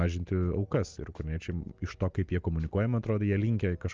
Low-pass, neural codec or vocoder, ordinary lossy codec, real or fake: 7.2 kHz; none; Opus, 24 kbps; real